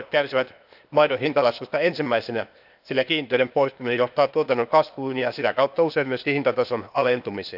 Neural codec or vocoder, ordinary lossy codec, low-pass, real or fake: codec, 16 kHz, 0.8 kbps, ZipCodec; none; 5.4 kHz; fake